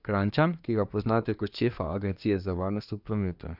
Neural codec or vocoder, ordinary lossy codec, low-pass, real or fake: codec, 24 kHz, 1 kbps, SNAC; none; 5.4 kHz; fake